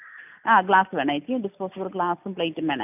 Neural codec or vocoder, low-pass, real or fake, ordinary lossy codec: none; 3.6 kHz; real; none